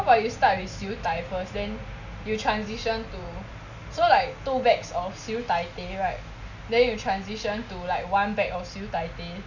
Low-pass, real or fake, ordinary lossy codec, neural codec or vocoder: 7.2 kHz; real; none; none